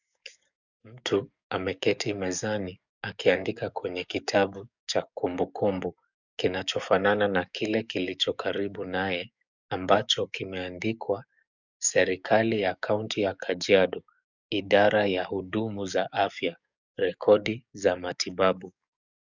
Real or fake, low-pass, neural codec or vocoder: fake; 7.2 kHz; codec, 44.1 kHz, 7.8 kbps, DAC